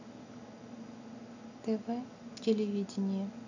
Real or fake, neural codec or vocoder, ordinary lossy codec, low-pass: real; none; none; 7.2 kHz